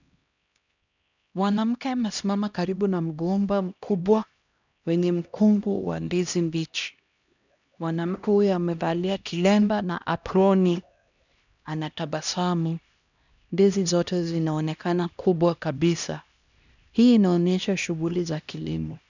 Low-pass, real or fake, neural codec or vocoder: 7.2 kHz; fake; codec, 16 kHz, 1 kbps, X-Codec, HuBERT features, trained on LibriSpeech